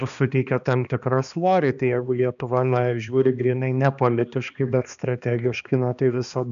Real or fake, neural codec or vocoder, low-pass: fake; codec, 16 kHz, 2 kbps, X-Codec, HuBERT features, trained on balanced general audio; 7.2 kHz